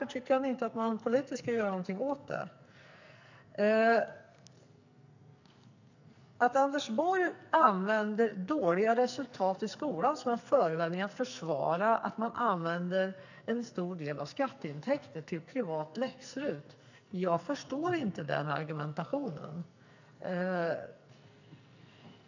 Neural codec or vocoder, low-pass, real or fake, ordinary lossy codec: codec, 44.1 kHz, 2.6 kbps, SNAC; 7.2 kHz; fake; none